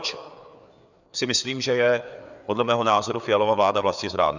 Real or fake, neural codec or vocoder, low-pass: fake; codec, 16 kHz, 4 kbps, FreqCodec, larger model; 7.2 kHz